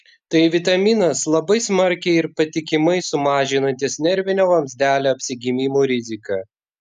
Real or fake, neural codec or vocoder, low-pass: real; none; 14.4 kHz